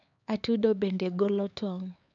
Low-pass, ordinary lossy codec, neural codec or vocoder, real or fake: 7.2 kHz; none; codec, 16 kHz, 4 kbps, X-Codec, HuBERT features, trained on LibriSpeech; fake